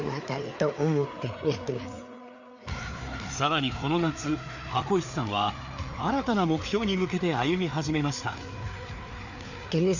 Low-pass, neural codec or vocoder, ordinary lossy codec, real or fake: 7.2 kHz; codec, 16 kHz, 4 kbps, FreqCodec, larger model; none; fake